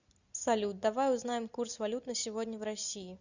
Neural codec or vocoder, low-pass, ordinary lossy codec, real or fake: none; 7.2 kHz; Opus, 64 kbps; real